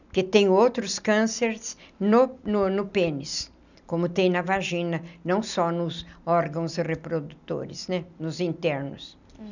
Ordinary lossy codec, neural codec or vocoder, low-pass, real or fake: none; none; 7.2 kHz; real